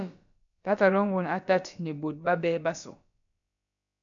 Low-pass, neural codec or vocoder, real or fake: 7.2 kHz; codec, 16 kHz, about 1 kbps, DyCAST, with the encoder's durations; fake